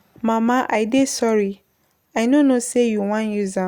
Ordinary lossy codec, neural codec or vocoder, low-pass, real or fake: Opus, 64 kbps; none; 19.8 kHz; real